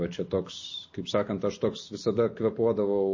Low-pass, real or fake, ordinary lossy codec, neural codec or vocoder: 7.2 kHz; real; MP3, 32 kbps; none